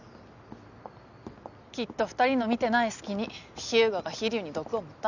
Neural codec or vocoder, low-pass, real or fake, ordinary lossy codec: none; 7.2 kHz; real; none